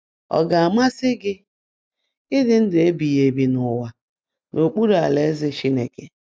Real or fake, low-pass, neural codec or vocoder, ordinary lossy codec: real; none; none; none